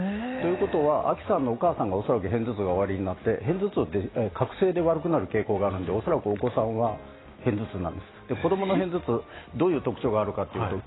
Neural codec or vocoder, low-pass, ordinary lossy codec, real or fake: none; 7.2 kHz; AAC, 16 kbps; real